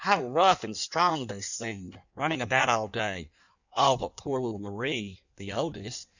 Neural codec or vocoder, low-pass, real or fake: codec, 16 kHz in and 24 kHz out, 1.1 kbps, FireRedTTS-2 codec; 7.2 kHz; fake